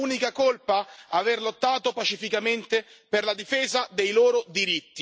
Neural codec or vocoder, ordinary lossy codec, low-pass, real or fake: none; none; none; real